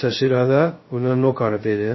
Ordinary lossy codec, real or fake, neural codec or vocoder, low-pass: MP3, 24 kbps; fake; codec, 16 kHz, 0.2 kbps, FocalCodec; 7.2 kHz